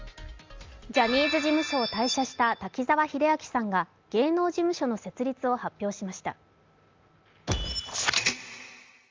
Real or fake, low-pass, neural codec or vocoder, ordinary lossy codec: real; 7.2 kHz; none; Opus, 32 kbps